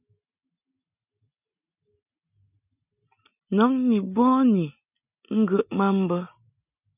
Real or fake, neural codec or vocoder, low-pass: real; none; 3.6 kHz